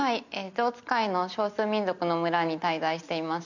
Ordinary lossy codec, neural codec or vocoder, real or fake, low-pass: none; none; real; 7.2 kHz